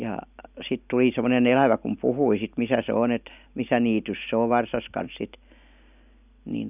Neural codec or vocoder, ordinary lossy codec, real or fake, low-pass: none; none; real; 3.6 kHz